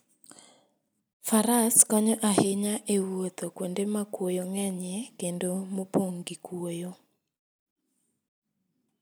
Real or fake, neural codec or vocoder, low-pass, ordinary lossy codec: real; none; none; none